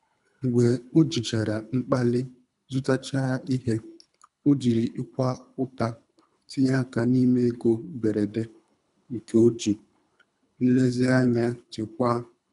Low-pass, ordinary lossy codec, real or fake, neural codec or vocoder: 10.8 kHz; none; fake; codec, 24 kHz, 3 kbps, HILCodec